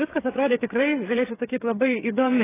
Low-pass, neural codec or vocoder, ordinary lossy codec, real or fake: 3.6 kHz; codec, 16 kHz, 8 kbps, FreqCodec, smaller model; AAC, 16 kbps; fake